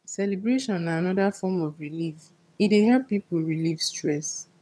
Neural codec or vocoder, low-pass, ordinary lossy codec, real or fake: vocoder, 22.05 kHz, 80 mel bands, HiFi-GAN; none; none; fake